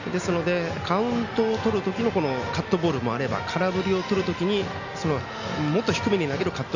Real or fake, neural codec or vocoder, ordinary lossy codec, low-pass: real; none; none; 7.2 kHz